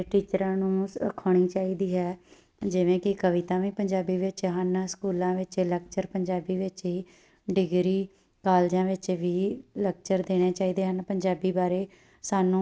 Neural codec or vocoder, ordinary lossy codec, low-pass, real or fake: none; none; none; real